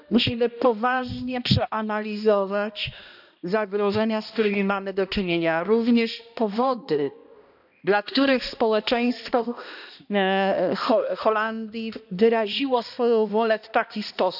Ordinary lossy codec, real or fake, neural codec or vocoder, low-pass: none; fake; codec, 16 kHz, 1 kbps, X-Codec, HuBERT features, trained on balanced general audio; 5.4 kHz